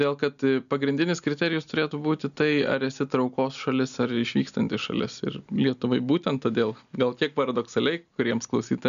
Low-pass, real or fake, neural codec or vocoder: 7.2 kHz; real; none